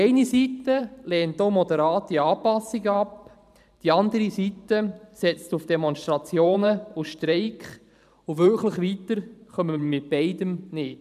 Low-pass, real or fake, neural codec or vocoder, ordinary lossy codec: 14.4 kHz; fake; vocoder, 44.1 kHz, 128 mel bands every 512 samples, BigVGAN v2; none